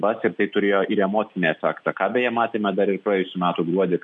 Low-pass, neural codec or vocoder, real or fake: 10.8 kHz; none; real